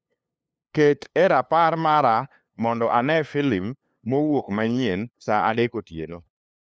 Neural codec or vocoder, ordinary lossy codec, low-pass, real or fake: codec, 16 kHz, 2 kbps, FunCodec, trained on LibriTTS, 25 frames a second; none; none; fake